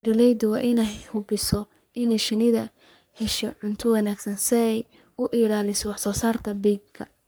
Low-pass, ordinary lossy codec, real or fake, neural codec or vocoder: none; none; fake; codec, 44.1 kHz, 3.4 kbps, Pupu-Codec